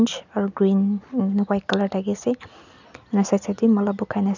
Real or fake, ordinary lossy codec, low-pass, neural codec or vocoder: real; none; 7.2 kHz; none